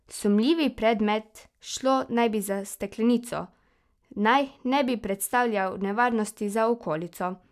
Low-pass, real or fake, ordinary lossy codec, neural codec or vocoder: 14.4 kHz; real; none; none